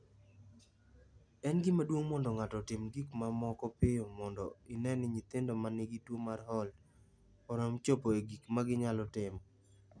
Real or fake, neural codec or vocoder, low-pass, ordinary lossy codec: real; none; 9.9 kHz; none